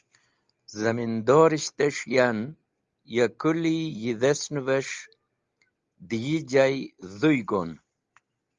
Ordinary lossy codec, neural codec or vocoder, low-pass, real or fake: Opus, 32 kbps; none; 7.2 kHz; real